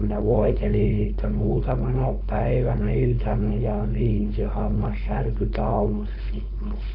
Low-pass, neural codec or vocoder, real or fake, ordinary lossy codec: 5.4 kHz; codec, 16 kHz, 4.8 kbps, FACodec; fake; AAC, 32 kbps